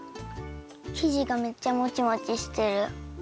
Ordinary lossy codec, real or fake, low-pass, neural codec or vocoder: none; real; none; none